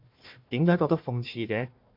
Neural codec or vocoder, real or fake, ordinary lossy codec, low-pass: codec, 16 kHz, 1 kbps, FunCodec, trained on Chinese and English, 50 frames a second; fake; MP3, 48 kbps; 5.4 kHz